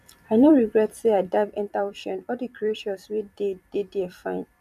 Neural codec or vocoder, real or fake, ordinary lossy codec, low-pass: vocoder, 44.1 kHz, 128 mel bands every 256 samples, BigVGAN v2; fake; none; 14.4 kHz